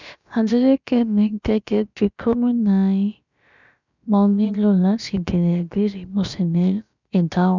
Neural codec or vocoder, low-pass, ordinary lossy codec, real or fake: codec, 16 kHz, about 1 kbps, DyCAST, with the encoder's durations; 7.2 kHz; none; fake